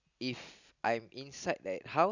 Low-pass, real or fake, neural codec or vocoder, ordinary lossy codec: 7.2 kHz; real; none; none